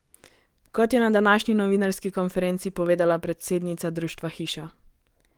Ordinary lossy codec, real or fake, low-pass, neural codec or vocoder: Opus, 24 kbps; fake; 19.8 kHz; codec, 44.1 kHz, 7.8 kbps, DAC